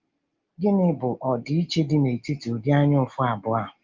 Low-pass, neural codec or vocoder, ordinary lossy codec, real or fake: 7.2 kHz; none; Opus, 32 kbps; real